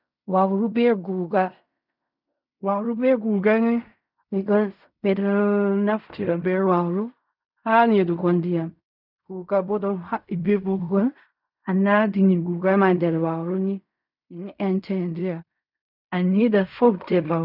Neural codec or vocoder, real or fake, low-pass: codec, 16 kHz in and 24 kHz out, 0.4 kbps, LongCat-Audio-Codec, fine tuned four codebook decoder; fake; 5.4 kHz